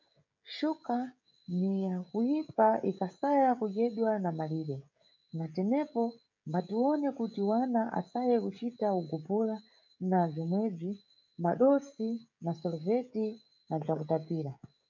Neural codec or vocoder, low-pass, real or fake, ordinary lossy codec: codec, 16 kHz, 16 kbps, FreqCodec, smaller model; 7.2 kHz; fake; MP3, 64 kbps